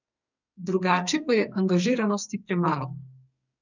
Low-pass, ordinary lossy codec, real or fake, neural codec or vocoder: 7.2 kHz; none; fake; codec, 44.1 kHz, 2.6 kbps, SNAC